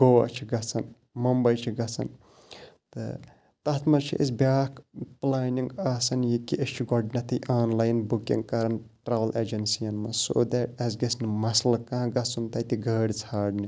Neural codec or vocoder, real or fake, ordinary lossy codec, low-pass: none; real; none; none